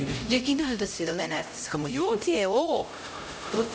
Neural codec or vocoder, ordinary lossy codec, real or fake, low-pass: codec, 16 kHz, 0.5 kbps, X-Codec, HuBERT features, trained on LibriSpeech; none; fake; none